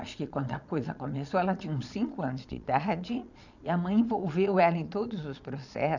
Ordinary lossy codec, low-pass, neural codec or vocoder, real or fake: none; 7.2 kHz; vocoder, 22.05 kHz, 80 mel bands, Vocos; fake